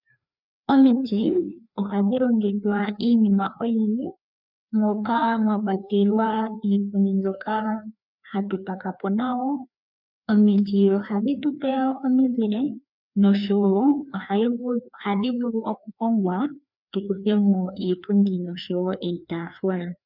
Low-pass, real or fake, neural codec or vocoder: 5.4 kHz; fake; codec, 16 kHz, 2 kbps, FreqCodec, larger model